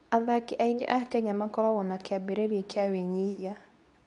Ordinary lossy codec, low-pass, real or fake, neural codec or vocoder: MP3, 96 kbps; 10.8 kHz; fake; codec, 24 kHz, 0.9 kbps, WavTokenizer, medium speech release version 2